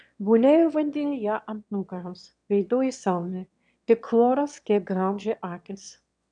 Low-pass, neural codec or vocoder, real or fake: 9.9 kHz; autoencoder, 22.05 kHz, a latent of 192 numbers a frame, VITS, trained on one speaker; fake